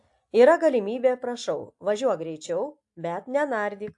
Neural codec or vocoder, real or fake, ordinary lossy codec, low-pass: none; real; AAC, 64 kbps; 10.8 kHz